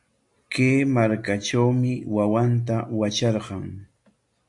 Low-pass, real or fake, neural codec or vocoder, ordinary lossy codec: 10.8 kHz; real; none; MP3, 64 kbps